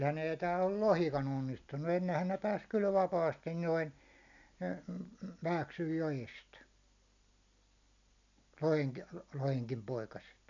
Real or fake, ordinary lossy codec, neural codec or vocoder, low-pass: real; none; none; 7.2 kHz